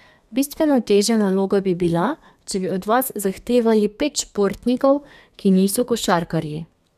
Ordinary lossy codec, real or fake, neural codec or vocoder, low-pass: none; fake; codec, 32 kHz, 1.9 kbps, SNAC; 14.4 kHz